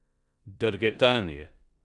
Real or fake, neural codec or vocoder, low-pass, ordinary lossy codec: fake; codec, 16 kHz in and 24 kHz out, 0.9 kbps, LongCat-Audio-Codec, four codebook decoder; 10.8 kHz; none